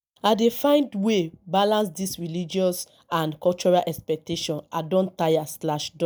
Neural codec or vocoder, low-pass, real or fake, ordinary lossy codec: none; none; real; none